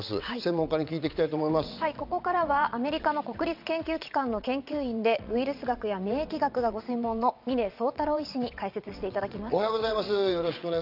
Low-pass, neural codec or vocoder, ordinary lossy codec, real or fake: 5.4 kHz; vocoder, 22.05 kHz, 80 mel bands, WaveNeXt; none; fake